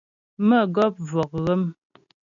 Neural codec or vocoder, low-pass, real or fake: none; 7.2 kHz; real